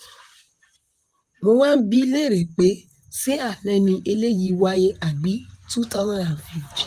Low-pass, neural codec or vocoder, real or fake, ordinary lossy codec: 14.4 kHz; vocoder, 44.1 kHz, 128 mel bands, Pupu-Vocoder; fake; Opus, 32 kbps